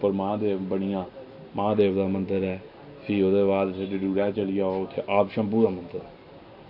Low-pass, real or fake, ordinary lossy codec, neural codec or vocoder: 5.4 kHz; real; AAC, 48 kbps; none